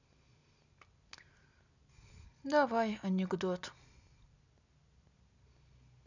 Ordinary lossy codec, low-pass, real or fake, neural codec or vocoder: none; 7.2 kHz; real; none